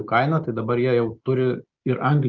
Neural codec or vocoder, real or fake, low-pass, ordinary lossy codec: none; real; 7.2 kHz; Opus, 24 kbps